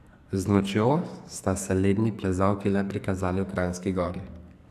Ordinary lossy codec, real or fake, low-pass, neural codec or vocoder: none; fake; 14.4 kHz; codec, 44.1 kHz, 2.6 kbps, SNAC